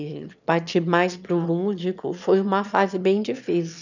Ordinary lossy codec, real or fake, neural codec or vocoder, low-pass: none; fake; autoencoder, 22.05 kHz, a latent of 192 numbers a frame, VITS, trained on one speaker; 7.2 kHz